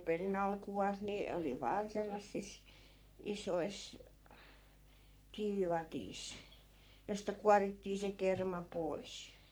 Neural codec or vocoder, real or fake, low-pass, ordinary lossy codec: codec, 44.1 kHz, 3.4 kbps, Pupu-Codec; fake; none; none